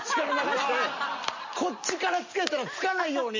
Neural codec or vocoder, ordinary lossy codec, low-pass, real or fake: none; MP3, 32 kbps; 7.2 kHz; real